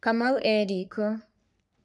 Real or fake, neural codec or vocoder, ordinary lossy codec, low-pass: fake; codec, 44.1 kHz, 3.4 kbps, Pupu-Codec; none; 10.8 kHz